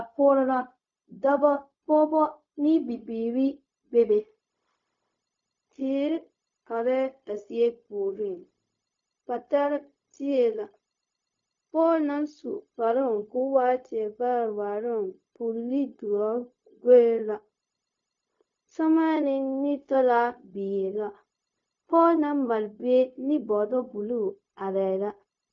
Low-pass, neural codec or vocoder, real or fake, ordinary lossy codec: 7.2 kHz; codec, 16 kHz, 0.4 kbps, LongCat-Audio-Codec; fake; MP3, 48 kbps